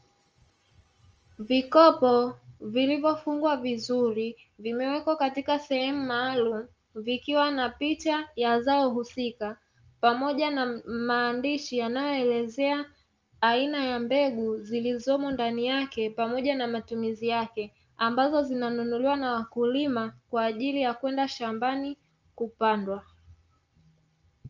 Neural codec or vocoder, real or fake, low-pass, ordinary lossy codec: none; real; 7.2 kHz; Opus, 24 kbps